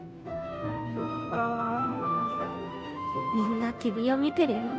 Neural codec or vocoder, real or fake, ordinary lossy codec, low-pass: codec, 16 kHz, 0.5 kbps, FunCodec, trained on Chinese and English, 25 frames a second; fake; none; none